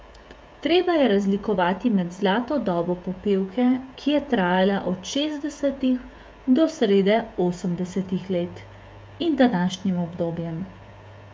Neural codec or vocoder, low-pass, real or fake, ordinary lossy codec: codec, 16 kHz, 16 kbps, FreqCodec, smaller model; none; fake; none